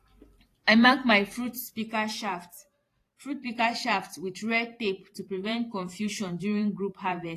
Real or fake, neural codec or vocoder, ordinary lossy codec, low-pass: fake; vocoder, 44.1 kHz, 128 mel bands every 512 samples, BigVGAN v2; AAC, 48 kbps; 14.4 kHz